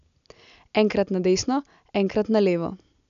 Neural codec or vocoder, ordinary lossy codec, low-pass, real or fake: none; none; 7.2 kHz; real